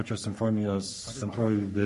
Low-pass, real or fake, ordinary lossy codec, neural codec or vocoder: 14.4 kHz; fake; MP3, 48 kbps; codec, 44.1 kHz, 3.4 kbps, Pupu-Codec